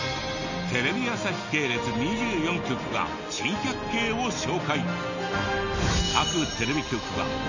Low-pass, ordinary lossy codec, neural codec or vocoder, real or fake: 7.2 kHz; none; none; real